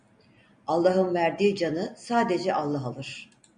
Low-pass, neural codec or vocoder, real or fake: 9.9 kHz; none; real